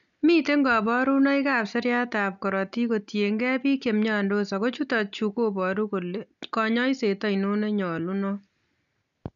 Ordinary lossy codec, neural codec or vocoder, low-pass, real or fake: none; none; 7.2 kHz; real